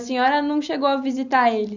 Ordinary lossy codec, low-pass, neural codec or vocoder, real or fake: none; 7.2 kHz; none; real